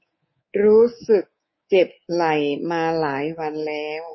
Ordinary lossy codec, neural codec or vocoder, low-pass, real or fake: MP3, 24 kbps; codec, 24 kHz, 3.1 kbps, DualCodec; 7.2 kHz; fake